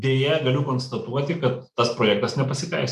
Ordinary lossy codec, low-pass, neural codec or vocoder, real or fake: MP3, 96 kbps; 14.4 kHz; none; real